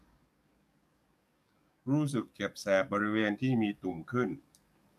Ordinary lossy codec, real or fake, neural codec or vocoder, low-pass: MP3, 96 kbps; fake; codec, 44.1 kHz, 7.8 kbps, DAC; 14.4 kHz